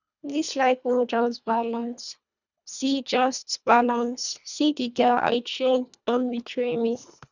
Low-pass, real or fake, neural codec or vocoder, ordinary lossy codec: 7.2 kHz; fake; codec, 24 kHz, 1.5 kbps, HILCodec; none